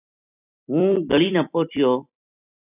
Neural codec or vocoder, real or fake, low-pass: vocoder, 44.1 kHz, 128 mel bands every 256 samples, BigVGAN v2; fake; 3.6 kHz